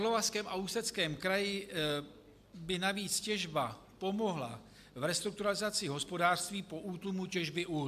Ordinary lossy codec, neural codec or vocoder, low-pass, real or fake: AAC, 96 kbps; none; 14.4 kHz; real